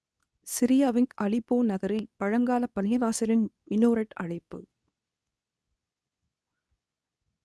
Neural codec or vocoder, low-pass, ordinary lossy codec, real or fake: codec, 24 kHz, 0.9 kbps, WavTokenizer, medium speech release version 2; none; none; fake